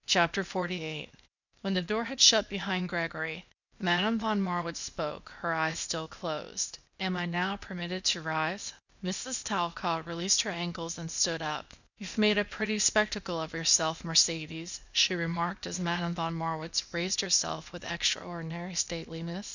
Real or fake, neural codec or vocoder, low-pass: fake; codec, 16 kHz, 0.8 kbps, ZipCodec; 7.2 kHz